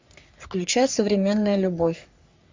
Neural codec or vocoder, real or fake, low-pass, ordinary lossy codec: codec, 44.1 kHz, 3.4 kbps, Pupu-Codec; fake; 7.2 kHz; MP3, 64 kbps